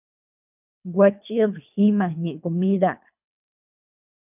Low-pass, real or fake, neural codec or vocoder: 3.6 kHz; fake; codec, 24 kHz, 3 kbps, HILCodec